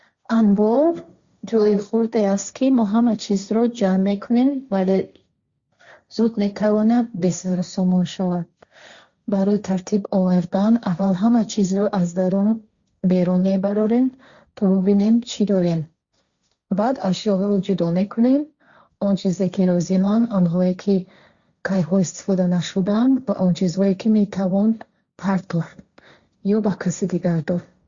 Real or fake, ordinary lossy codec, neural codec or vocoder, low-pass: fake; Opus, 64 kbps; codec, 16 kHz, 1.1 kbps, Voila-Tokenizer; 7.2 kHz